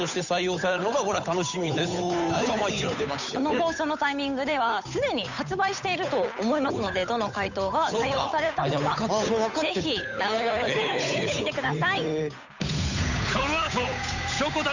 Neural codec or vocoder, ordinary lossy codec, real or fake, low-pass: codec, 16 kHz, 8 kbps, FunCodec, trained on Chinese and English, 25 frames a second; none; fake; 7.2 kHz